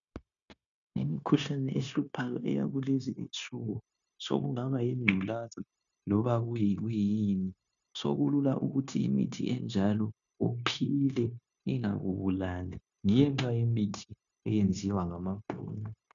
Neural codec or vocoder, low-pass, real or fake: codec, 16 kHz, 0.9 kbps, LongCat-Audio-Codec; 7.2 kHz; fake